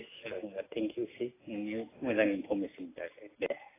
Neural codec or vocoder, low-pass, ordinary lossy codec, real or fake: none; 3.6 kHz; AAC, 16 kbps; real